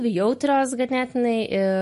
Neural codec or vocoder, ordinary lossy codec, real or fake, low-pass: none; MP3, 48 kbps; real; 14.4 kHz